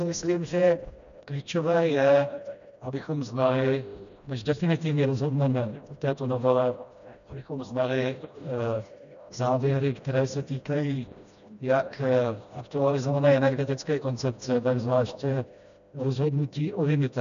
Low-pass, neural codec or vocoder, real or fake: 7.2 kHz; codec, 16 kHz, 1 kbps, FreqCodec, smaller model; fake